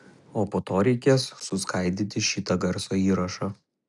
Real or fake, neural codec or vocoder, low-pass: real; none; 10.8 kHz